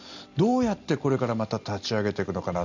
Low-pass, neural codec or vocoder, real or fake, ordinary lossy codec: 7.2 kHz; none; real; none